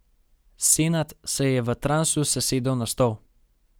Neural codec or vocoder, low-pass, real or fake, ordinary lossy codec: none; none; real; none